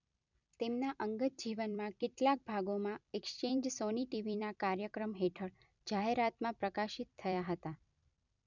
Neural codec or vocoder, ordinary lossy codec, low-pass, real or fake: vocoder, 44.1 kHz, 128 mel bands every 256 samples, BigVGAN v2; none; 7.2 kHz; fake